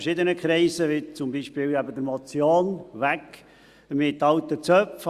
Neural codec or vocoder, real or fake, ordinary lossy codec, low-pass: none; real; Opus, 64 kbps; 14.4 kHz